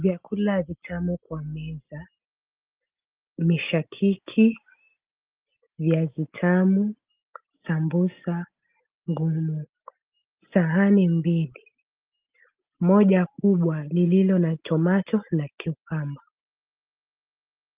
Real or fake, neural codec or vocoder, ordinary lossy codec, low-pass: real; none; Opus, 32 kbps; 3.6 kHz